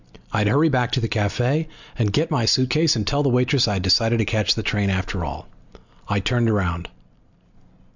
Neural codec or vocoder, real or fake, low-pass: none; real; 7.2 kHz